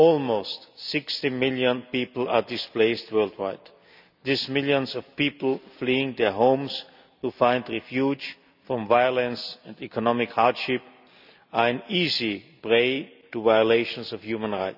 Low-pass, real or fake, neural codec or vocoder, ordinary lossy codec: 5.4 kHz; real; none; none